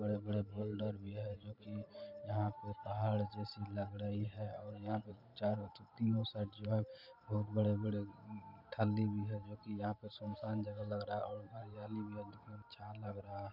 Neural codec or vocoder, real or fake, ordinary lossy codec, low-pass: vocoder, 44.1 kHz, 128 mel bands every 512 samples, BigVGAN v2; fake; none; 5.4 kHz